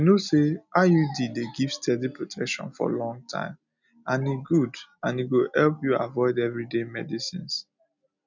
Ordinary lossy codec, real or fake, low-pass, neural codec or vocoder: none; real; 7.2 kHz; none